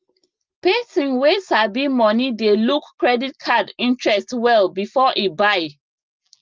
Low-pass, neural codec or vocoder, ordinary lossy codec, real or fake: 7.2 kHz; none; Opus, 32 kbps; real